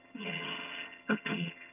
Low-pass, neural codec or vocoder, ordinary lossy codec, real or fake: 3.6 kHz; vocoder, 22.05 kHz, 80 mel bands, HiFi-GAN; none; fake